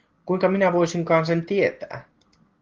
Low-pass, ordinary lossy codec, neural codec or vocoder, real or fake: 7.2 kHz; Opus, 16 kbps; none; real